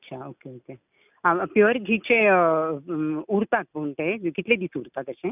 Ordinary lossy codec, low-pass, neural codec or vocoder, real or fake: none; 3.6 kHz; none; real